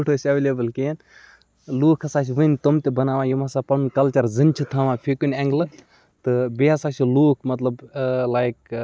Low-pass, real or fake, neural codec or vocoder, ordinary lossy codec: none; real; none; none